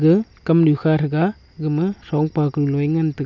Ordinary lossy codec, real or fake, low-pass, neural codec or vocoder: none; real; 7.2 kHz; none